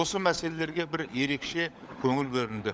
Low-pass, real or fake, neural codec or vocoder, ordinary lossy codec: none; fake; codec, 16 kHz, 16 kbps, FunCodec, trained on LibriTTS, 50 frames a second; none